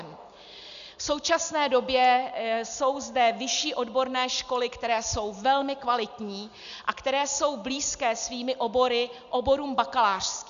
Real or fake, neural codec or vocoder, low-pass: real; none; 7.2 kHz